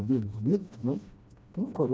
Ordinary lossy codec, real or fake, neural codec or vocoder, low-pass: none; fake; codec, 16 kHz, 1 kbps, FreqCodec, smaller model; none